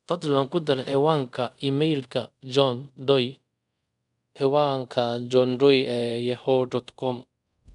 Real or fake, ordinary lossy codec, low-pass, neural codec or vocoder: fake; none; 10.8 kHz; codec, 24 kHz, 0.5 kbps, DualCodec